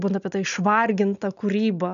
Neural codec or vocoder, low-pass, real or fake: none; 7.2 kHz; real